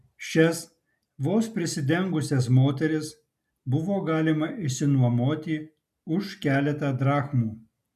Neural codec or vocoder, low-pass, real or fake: none; 14.4 kHz; real